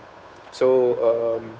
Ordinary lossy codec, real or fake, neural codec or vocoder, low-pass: none; fake; codec, 16 kHz, 8 kbps, FunCodec, trained on Chinese and English, 25 frames a second; none